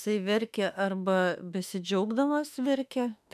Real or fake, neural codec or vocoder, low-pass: fake; autoencoder, 48 kHz, 32 numbers a frame, DAC-VAE, trained on Japanese speech; 14.4 kHz